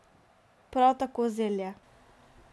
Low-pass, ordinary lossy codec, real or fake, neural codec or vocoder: none; none; real; none